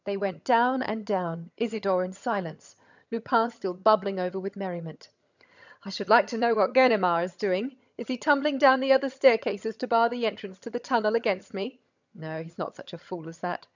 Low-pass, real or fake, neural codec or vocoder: 7.2 kHz; fake; vocoder, 22.05 kHz, 80 mel bands, HiFi-GAN